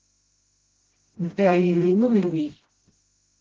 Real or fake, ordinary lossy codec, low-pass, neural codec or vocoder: fake; Opus, 16 kbps; 7.2 kHz; codec, 16 kHz, 0.5 kbps, FreqCodec, smaller model